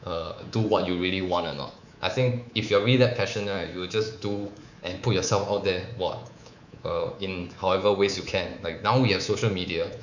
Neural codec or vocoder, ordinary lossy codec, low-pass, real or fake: codec, 24 kHz, 3.1 kbps, DualCodec; none; 7.2 kHz; fake